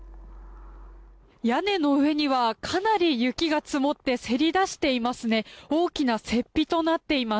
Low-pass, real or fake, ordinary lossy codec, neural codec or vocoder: none; real; none; none